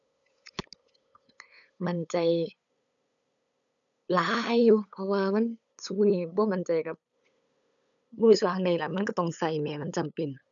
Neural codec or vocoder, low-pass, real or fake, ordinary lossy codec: codec, 16 kHz, 8 kbps, FunCodec, trained on LibriTTS, 25 frames a second; 7.2 kHz; fake; none